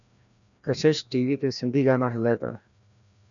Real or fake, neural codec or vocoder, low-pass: fake; codec, 16 kHz, 1 kbps, FreqCodec, larger model; 7.2 kHz